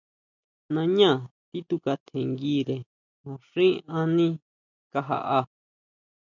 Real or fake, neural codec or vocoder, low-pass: real; none; 7.2 kHz